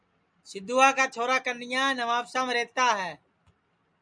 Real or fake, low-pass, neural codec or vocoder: real; 9.9 kHz; none